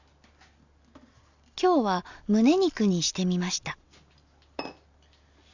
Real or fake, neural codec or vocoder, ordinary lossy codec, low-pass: real; none; none; 7.2 kHz